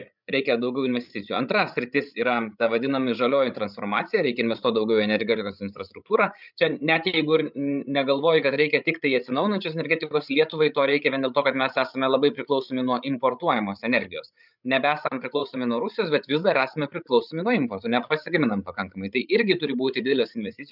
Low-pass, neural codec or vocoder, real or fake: 5.4 kHz; codec, 16 kHz, 16 kbps, FreqCodec, larger model; fake